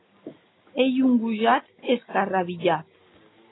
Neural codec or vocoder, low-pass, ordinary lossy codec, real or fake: none; 7.2 kHz; AAC, 16 kbps; real